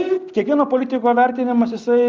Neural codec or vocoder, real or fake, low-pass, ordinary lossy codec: none; real; 7.2 kHz; Opus, 32 kbps